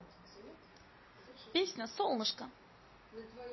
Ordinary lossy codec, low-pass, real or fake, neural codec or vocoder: MP3, 24 kbps; 7.2 kHz; real; none